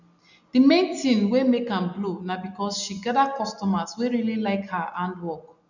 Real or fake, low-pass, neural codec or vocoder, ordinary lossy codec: real; 7.2 kHz; none; none